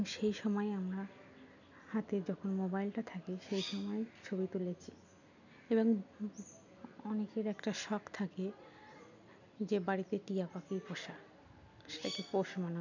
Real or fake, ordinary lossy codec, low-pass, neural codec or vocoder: real; none; 7.2 kHz; none